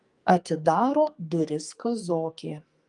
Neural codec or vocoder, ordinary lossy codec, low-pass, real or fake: codec, 44.1 kHz, 2.6 kbps, SNAC; Opus, 32 kbps; 10.8 kHz; fake